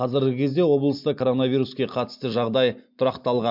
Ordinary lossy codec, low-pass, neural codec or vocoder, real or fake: MP3, 48 kbps; 5.4 kHz; none; real